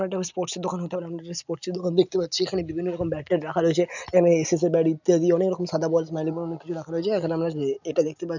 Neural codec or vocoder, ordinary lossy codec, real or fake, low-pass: none; none; real; 7.2 kHz